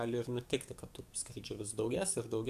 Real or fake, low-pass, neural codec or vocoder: fake; 14.4 kHz; codec, 44.1 kHz, 7.8 kbps, DAC